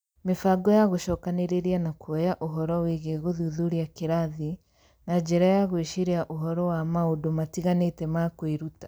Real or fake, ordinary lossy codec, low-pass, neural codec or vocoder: real; none; none; none